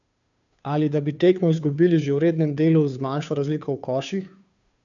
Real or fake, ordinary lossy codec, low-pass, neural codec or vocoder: fake; none; 7.2 kHz; codec, 16 kHz, 2 kbps, FunCodec, trained on Chinese and English, 25 frames a second